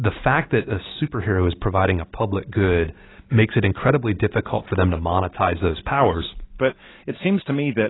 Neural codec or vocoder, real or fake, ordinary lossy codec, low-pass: none; real; AAC, 16 kbps; 7.2 kHz